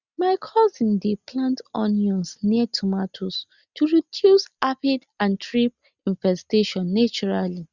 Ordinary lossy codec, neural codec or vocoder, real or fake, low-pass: Opus, 64 kbps; none; real; 7.2 kHz